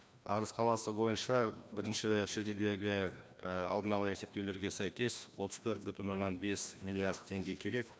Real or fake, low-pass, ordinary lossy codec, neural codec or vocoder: fake; none; none; codec, 16 kHz, 1 kbps, FreqCodec, larger model